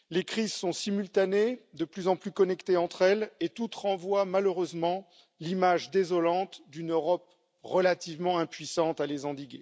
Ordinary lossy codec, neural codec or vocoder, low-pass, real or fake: none; none; none; real